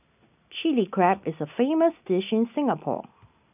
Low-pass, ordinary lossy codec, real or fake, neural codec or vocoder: 3.6 kHz; none; real; none